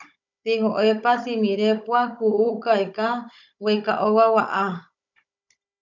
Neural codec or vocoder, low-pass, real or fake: codec, 16 kHz, 16 kbps, FunCodec, trained on Chinese and English, 50 frames a second; 7.2 kHz; fake